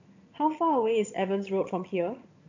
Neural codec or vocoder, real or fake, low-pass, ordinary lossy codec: vocoder, 22.05 kHz, 80 mel bands, HiFi-GAN; fake; 7.2 kHz; AAC, 48 kbps